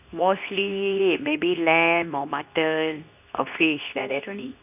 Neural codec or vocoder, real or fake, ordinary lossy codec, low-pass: codec, 24 kHz, 0.9 kbps, WavTokenizer, medium speech release version 2; fake; none; 3.6 kHz